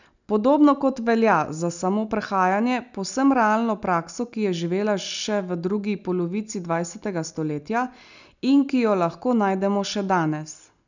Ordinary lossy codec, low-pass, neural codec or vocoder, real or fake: none; 7.2 kHz; none; real